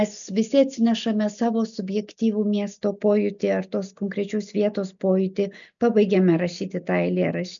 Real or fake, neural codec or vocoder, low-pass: real; none; 7.2 kHz